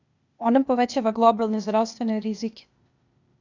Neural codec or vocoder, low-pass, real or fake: codec, 16 kHz, 0.8 kbps, ZipCodec; 7.2 kHz; fake